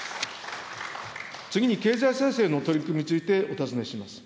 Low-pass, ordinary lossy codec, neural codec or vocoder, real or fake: none; none; none; real